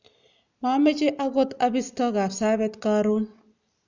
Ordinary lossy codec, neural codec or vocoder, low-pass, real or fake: none; none; 7.2 kHz; real